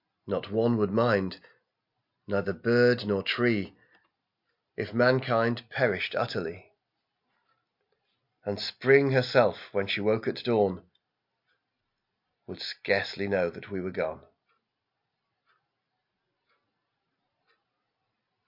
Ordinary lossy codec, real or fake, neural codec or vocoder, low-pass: MP3, 48 kbps; real; none; 5.4 kHz